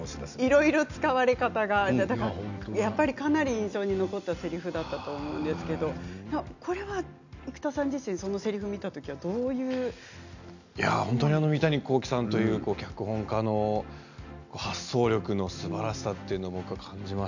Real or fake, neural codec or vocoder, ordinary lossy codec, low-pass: real; none; none; 7.2 kHz